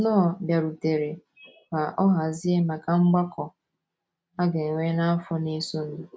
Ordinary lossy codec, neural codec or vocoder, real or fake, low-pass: none; none; real; none